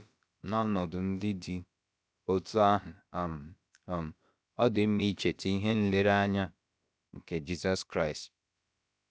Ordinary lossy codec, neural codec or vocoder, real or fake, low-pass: none; codec, 16 kHz, about 1 kbps, DyCAST, with the encoder's durations; fake; none